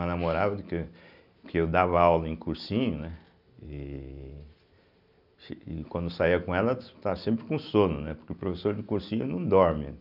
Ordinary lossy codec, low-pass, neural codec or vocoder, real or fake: none; 5.4 kHz; none; real